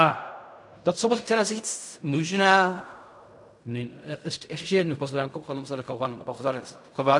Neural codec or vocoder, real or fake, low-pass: codec, 16 kHz in and 24 kHz out, 0.4 kbps, LongCat-Audio-Codec, fine tuned four codebook decoder; fake; 10.8 kHz